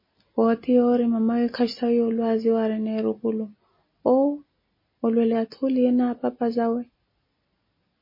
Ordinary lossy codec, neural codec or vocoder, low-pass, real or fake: MP3, 24 kbps; none; 5.4 kHz; real